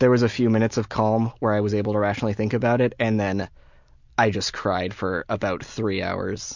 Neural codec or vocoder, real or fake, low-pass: none; real; 7.2 kHz